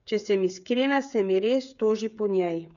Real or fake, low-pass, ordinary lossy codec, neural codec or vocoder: fake; 7.2 kHz; none; codec, 16 kHz, 8 kbps, FreqCodec, smaller model